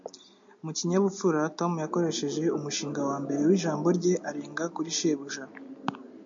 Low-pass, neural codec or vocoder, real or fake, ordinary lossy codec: 7.2 kHz; none; real; MP3, 64 kbps